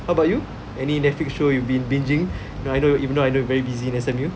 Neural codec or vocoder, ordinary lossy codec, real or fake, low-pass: none; none; real; none